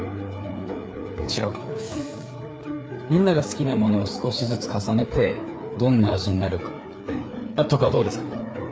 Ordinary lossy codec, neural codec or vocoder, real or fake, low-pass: none; codec, 16 kHz, 4 kbps, FreqCodec, larger model; fake; none